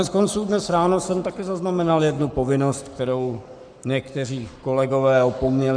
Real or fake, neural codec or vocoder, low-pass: fake; codec, 44.1 kHz, 7.8 kbps, Pupu-Codec; 9.9 kHz